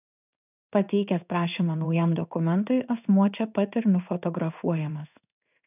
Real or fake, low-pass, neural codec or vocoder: fake; 3.6 kHz; codec, 16 kHz in and 24 kHz out, 1 kbps, XY-Tokenizer